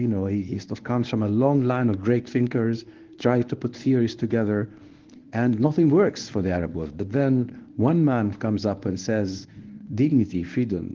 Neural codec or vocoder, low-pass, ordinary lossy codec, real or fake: codec, 24 kHz, 0.9 kbps, WavTokenizer, medium speech release version 1; 7.2 kHz; Opus, 16 kbps; fake